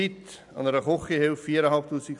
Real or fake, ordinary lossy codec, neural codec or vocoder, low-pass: real; none; none; 10.8 kHz